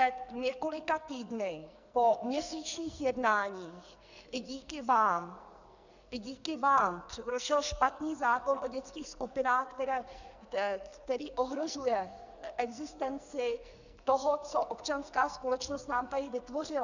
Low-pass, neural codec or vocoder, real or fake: 7.2 kHz; codec, 44.1 kHz, 2.6 kbps, SNAC; fake